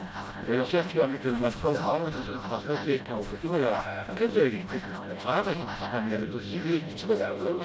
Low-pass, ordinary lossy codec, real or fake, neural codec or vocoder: none; none; fake; codec, 16 kHz, 0.5 kbps, FreqCodec, smaller model